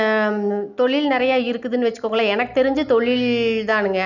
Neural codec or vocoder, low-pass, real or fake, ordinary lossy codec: none; 7.2 kHz; real; none